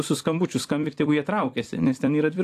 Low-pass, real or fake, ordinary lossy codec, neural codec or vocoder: 14.4 kHz; fake; AAC, 64 kbps; vocoder, 44.1 kHz, 128 mel bands every 256 samples, BigVGAN v2